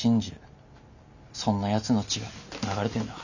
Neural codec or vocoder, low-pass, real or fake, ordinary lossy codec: none; 7.2 kHz; real; none